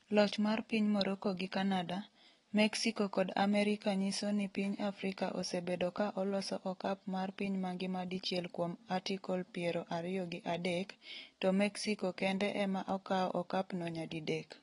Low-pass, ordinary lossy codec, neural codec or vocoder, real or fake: 10.8 kHz; AAC, 32 kbps; none; real